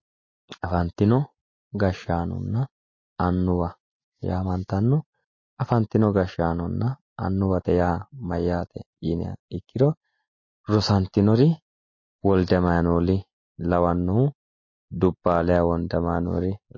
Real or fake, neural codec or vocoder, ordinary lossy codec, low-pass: real; none; MP3, 32 kbps; 7.2 kHz